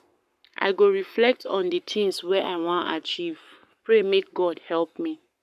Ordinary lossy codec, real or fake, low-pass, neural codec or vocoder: none; fake; 14.4 kHz; codec, 44.1 kHz, 7.8 kbps, Pupu-Codec